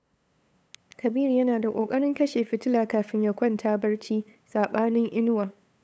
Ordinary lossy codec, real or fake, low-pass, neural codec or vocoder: none; fake; none; codec, 16 kHz, 8 kbps, FunCodec, trained on LibriTTS, 25 frames a second